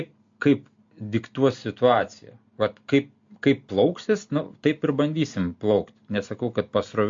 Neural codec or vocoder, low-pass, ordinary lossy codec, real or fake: none; 7.2 kHz; MP3, 48 kbps; real